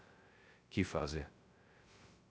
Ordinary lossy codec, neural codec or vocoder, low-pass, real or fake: none; codec, 16 kHz, 0.2 kbps, FocalCodec; none; fake